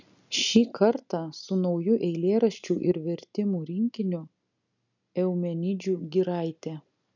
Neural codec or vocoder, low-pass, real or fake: none; 7.2 kHz; real